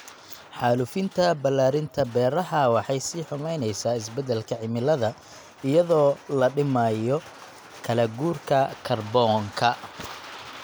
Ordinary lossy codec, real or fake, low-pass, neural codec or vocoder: none; real; none; none